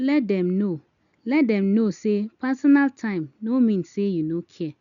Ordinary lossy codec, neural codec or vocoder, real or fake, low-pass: none; none; real; 7.2 kHz